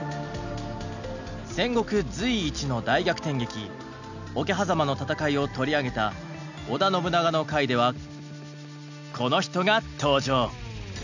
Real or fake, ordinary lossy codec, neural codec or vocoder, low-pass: real; none; none; 7.2 kHz